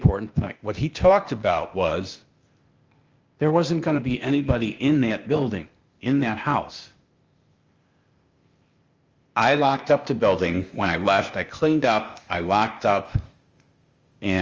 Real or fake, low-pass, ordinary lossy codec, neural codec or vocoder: fake; 7.2 kHz; Opus, 32 kbps; codec, 16 kHz, 0.8 kbps, ZipCodec